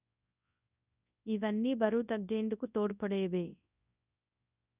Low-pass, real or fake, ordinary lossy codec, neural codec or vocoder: 3.6 kHz; fake; none; codec, 24 kHz, 0.9 kbps, WavTokenizer, large speech release